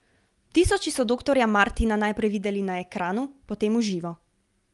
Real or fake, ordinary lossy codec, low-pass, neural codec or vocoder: real; Opus, 32 kbps; 10.8 kHz; none